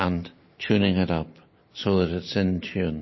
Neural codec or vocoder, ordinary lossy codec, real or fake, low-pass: none; MP3, 24 kbps; real; 7.2 kHz